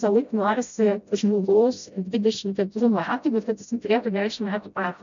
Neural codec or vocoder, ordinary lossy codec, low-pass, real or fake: codec, 16 kHz, 0.5 kbps, FreqCodec, smaller model; MP3, 64 kbps; 7.2 kHz; fake